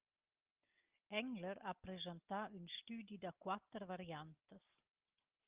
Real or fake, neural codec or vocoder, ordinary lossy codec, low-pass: real; none; Opus, 32 kbps; 3.6 kHz